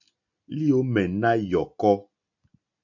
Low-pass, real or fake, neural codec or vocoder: 7.2 kHz; real; none